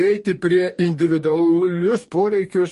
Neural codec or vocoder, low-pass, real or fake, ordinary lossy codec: codec, 44.1 kHz, 2.6 kbps, DAC; 14.4 kHz; fake; MP3, 48 kbps